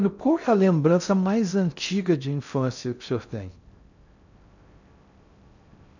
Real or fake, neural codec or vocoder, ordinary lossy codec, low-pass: fake; codec, 16 kHz in and 24 kHz out, 0.6 kbps, FocalCodec, streaming, 4096 codes; none; 7.2 kHz